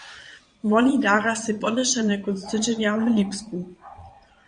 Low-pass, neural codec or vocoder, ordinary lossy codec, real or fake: 9.9 kHz; vocoder, 22.05 kHz, 80 mel bands, Vocos; Opus, 64 kbps; fake